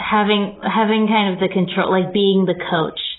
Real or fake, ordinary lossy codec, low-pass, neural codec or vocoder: real; AAC, 16 kbps; 7.2 kHz; none